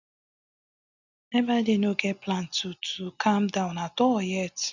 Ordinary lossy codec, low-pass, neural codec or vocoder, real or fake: none; 7.2 kHz; none; real